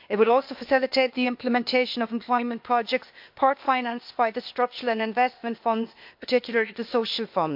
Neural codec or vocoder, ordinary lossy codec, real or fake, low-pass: codec, 16 kHz, 0.8 kbps, ZipCodec; MP3, 48 kbps; fake; 5.4 kHz